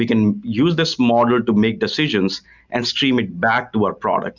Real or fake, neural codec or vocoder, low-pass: real; none; 7.2 kHz